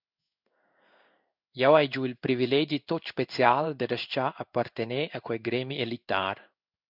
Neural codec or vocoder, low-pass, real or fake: codec, 16 kHz in and 24 kHz out, 1 kbps, XY-Tokenizer; 5.4 kHz; fake